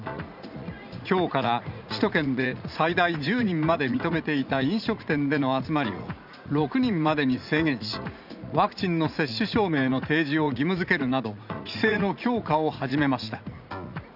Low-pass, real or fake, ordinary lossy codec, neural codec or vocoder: 5.4 kHz; fake; none; vocoder, 44.1 kHz, 80 mel bands, Vocos